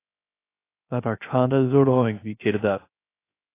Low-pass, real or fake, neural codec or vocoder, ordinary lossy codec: 3.6 kHz; fake; codec, 16 kHz, 0.3 kbps, FocalCodec; AAC, 24 kbps